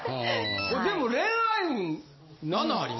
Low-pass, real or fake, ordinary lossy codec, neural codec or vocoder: 7.2 kHz; real; MP3, 24 kbps; none